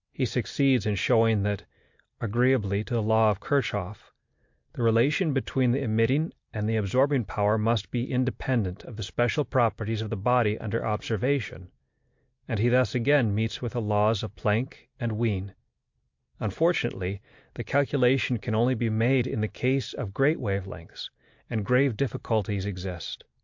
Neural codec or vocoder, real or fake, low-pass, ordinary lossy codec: none; real; 7.2 kHz; MP3, 48 kbps